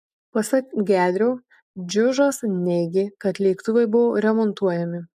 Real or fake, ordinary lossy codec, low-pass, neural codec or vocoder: real; MP3, 96 kbps; 14.4 kHz; none